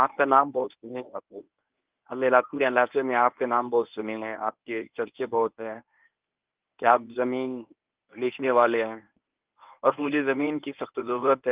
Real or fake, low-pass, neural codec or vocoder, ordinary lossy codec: fake; 3.6 kHz; codec, 24 kHz, 0.9 kbps, WavTokenizer, medium speech release version 1; Opus, 32 kbps